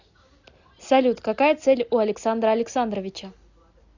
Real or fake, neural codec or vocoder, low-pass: real; none; 7.2 kHz